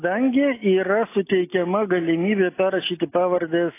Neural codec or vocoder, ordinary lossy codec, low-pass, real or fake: none; AAC, 24 kbps; 3.6 kHz; real